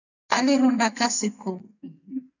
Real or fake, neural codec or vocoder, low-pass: fake; codec, 44.1 kHz, 2.6 kbps, SNAC; 7.2 kHz